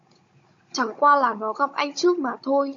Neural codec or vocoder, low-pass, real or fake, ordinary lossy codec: codec, 16 kHz, 16 kbps, FunCodec, trained on Chinese and English, 50 frames a second; 7.2 kHz; fake; MP3, 48 kbps